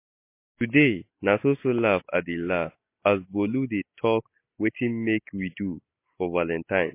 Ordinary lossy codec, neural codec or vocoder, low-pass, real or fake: MP3, 24 kbps; none; 3.6 kHz; real